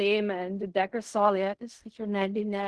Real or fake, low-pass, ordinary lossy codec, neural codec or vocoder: fake; 10.8 kHz; Opus, 16 kbps; codec, 16 kHz in and 24 kHz out, 0.4 kbps, LongCat-Audio-Codec, fine tuned four codebook decoder